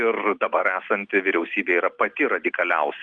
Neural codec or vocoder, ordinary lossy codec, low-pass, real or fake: none; Opus, 24 kbps; 9.9 kHz; real